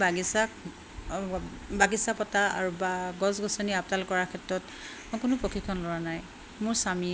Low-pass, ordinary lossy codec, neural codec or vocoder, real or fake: none; none; none; real